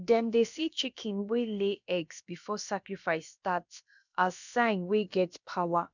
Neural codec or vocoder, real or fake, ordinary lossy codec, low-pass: codec, 16 kHz, about 1 kbps, DyCAST, with the encoder's durations; fake; none; 7.2 kHz